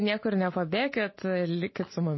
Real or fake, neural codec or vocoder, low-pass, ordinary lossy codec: real; none; 7.2 kHz; MP3, 24 kbps